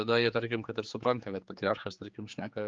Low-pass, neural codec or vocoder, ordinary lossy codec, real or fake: 7.2 kHz; codec, 16 kHz, 4 kbps, X-Codec, HuBERT features, trained on general audio; Opus, 32 kbps; fake